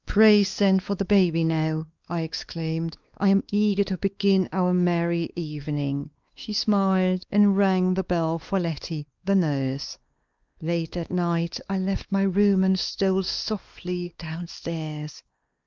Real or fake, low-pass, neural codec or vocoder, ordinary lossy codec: fake; 7.2 kHz; codec, 16 kHz, 2 kbps, X-Codec, WavLM features, trained on Multilingual LibriSpeech; Opus, 24 kbps